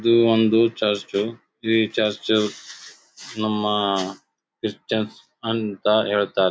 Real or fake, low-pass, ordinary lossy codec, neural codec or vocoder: real; none; none; none